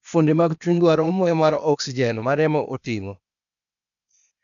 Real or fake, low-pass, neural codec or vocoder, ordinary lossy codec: fake; 7.2 kHz; codec, 16 kHz, 0.8 kbps, ZipCodec; none